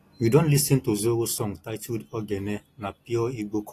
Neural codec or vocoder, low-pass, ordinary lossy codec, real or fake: none; 14.4 kHz; AAC, 48 kbps; real